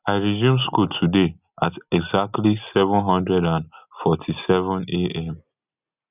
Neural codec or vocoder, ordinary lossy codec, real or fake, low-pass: none; none; real; 3.6 kHz